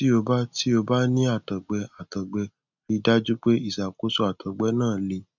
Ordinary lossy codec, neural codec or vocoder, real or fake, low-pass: none; none; real; 7.2 kHz